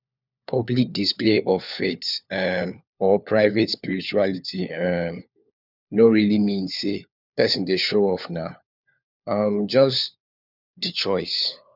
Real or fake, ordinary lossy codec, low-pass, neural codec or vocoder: fake; none; 5.4 kHz; codec, 16 kHz, 4 kbps, FunCodec, trained on LibriTTS, 50 frames a second